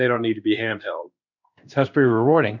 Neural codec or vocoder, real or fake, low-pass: codec, 16 kHz in and 24 kHz out, 1 kbps, XY-Tokenizer; fake; 7.2 kHz